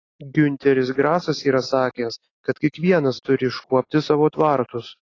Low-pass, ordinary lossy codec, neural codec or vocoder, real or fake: 7.2 kHz; AAC, 32 kbps; none; real